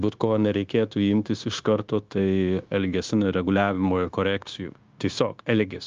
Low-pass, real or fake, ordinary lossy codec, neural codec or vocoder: 7.2 kHz; fake; Opus, 24 kbps; codec, 16 kHz, 0.9 kbps, LongCat-Audio-Codec